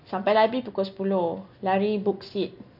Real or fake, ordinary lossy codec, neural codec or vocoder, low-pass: real; none; none; 5.4 kHz